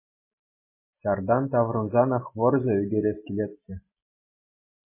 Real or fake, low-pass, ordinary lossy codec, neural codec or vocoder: real; 3.6 kHz; MP3, 16 kbps; none